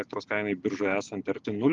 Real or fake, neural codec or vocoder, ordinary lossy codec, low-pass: real; none; Opus, 32 kbps; 7.2 kHz